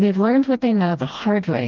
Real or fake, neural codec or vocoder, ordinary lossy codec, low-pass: fake; codec, 16 kHz, 1 kbps, FreqCodec, smaller model; Opus, 32 kbps; 7.2 kHz